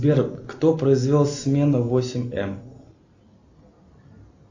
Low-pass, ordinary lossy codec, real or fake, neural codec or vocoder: 7.2 kHz; AAC, 48 kbps; real; none